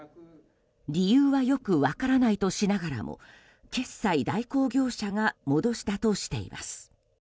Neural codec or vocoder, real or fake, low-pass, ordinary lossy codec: none; real; none; none